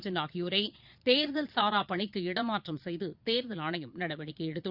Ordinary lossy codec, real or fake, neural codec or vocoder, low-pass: Opus, 64 kbps; fake; vocoder, 22.05 kHz, 80 mel bands, Vocos; 5.4 kHz